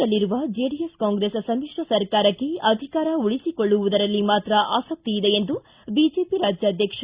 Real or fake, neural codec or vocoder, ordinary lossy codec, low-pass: real; none; Opus, 64 kbps; 3.6 kHz